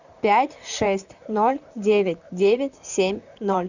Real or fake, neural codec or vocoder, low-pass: fake; vocoder, 44.1 kHz, 128 mel bands, Pupu-Vocoder; 7.2 kHz